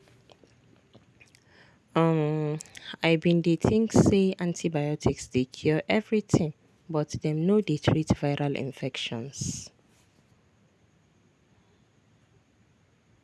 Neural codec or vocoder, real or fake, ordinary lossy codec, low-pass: none; real; none; none